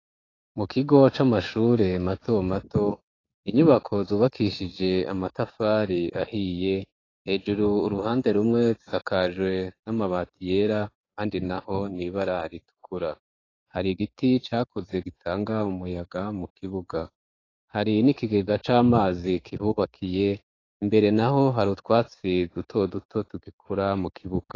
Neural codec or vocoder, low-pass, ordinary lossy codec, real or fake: codec, 16 kHz, 6 kbps, DAC; 7.2 kHz; AAC, 32 kbps; fake